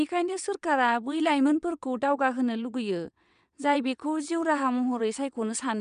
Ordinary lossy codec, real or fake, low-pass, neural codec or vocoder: none; fake; 9.9 kHz; vocoder, 22.05 kHz, 80 mel bands, WaveNeXt